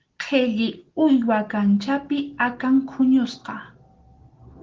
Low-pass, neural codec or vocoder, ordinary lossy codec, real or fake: 7.2 kHz; none; Opus, 16 kbps; real